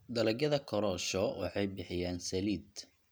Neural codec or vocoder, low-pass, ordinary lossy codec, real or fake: none; none; none; real